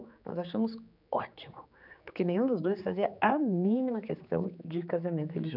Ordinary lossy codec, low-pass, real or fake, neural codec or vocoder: none; 5.4 kHz; fake; codec, 16 kHz, 4 kbps, X-Codec, HuBERT features, trained on general audio